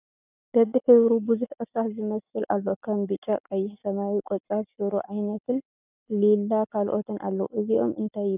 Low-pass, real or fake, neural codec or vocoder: 3.6 kHz; real; none